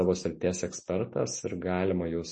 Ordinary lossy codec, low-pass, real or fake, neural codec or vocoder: MP3, 32 kbps; 10.8 kHz; real; none